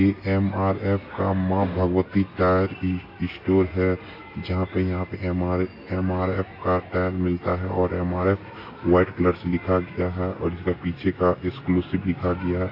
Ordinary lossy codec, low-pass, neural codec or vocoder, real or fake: AAC, 32 kbps; 5.4 kHz; none; real